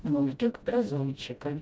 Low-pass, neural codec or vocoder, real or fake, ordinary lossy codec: none; codec, 16 kHz, 0.5 kbps, FreqCodec, smaller model; fake; none